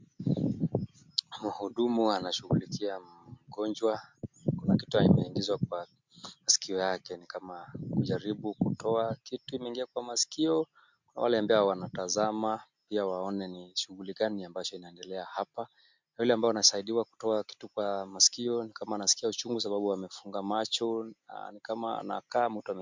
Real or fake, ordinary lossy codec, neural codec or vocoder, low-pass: real; MP3, 64 kbps; none; 7.2 kHz